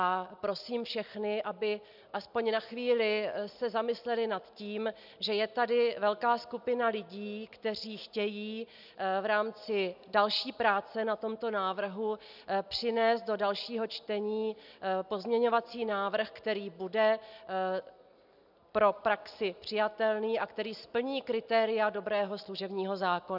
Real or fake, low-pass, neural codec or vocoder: real; 5.4 kHz; none